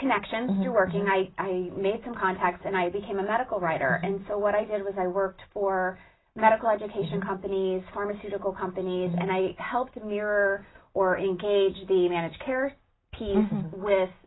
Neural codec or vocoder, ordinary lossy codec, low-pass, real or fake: none; AAC, 16 kbps; 7.2 kHz; real